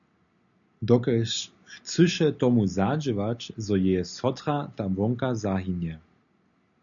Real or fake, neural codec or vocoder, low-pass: real; none; 7.2 kHz